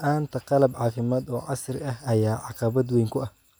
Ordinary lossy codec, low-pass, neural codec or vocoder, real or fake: none; none; none; real